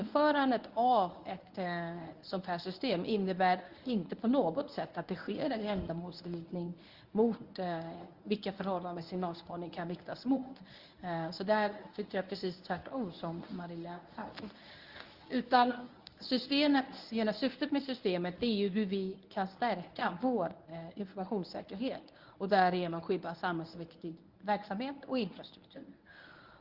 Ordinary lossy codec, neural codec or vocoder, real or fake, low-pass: Opus, 32 kbps; codec, 24 kHz, 0.9 kbps, WavTokenizer, medium speech release version 1; fake; 5.4 kHz